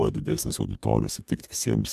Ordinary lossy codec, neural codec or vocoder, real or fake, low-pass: MP3, 96 kbps; codec, 44.1 kHz, 2.6 kbps, DAC; fake; 14.4 kHz